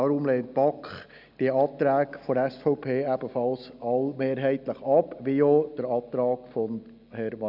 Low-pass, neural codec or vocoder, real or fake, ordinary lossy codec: 5.4 kHz; none; real; none